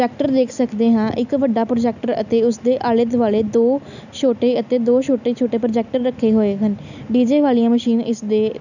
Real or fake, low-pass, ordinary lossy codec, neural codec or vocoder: real; 7.2 kHz; none; none